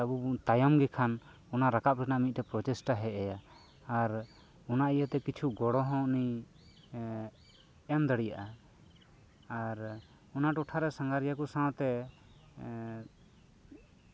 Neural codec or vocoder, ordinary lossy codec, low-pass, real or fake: none; none; none; real